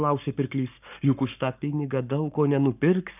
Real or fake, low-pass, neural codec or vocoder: fake; 3.6 kHz; codec, 44.1 kHz, 7.8 kbps, Pupu-Codec